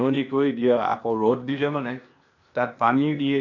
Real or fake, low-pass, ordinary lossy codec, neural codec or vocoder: fake; 7.2 kHz; none; codec, 16 kHz, 0.8 kbps, ZipCodec